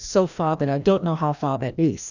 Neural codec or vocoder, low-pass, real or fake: codec, 16 kHz, 1 kbps, FreqCodec, larger model; 7.2 kHz; fake